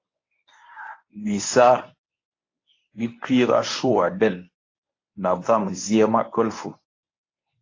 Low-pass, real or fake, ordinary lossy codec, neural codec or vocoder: 7.2 kHz; fake; AAC, 32 kbps; codec, 24 kHz, 0.9 kbps, WavTokenizer, medium speech release version 1